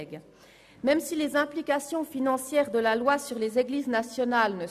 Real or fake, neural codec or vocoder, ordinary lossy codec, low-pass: real; none; none; 14.4 kHz